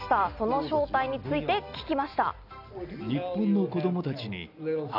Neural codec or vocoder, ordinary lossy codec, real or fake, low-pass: none; none; real; 5.4 kHz